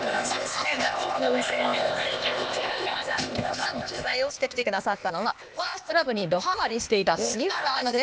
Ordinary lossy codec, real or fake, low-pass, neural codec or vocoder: none; fake; none; codec, 16 kHz, 0.8 kbps, ZipCodec